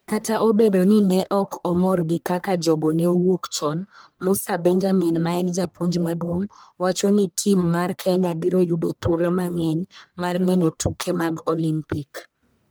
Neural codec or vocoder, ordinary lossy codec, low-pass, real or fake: codec, 44.1 kHz, 1.7 kbps, Pupu-Codec; none; none; fake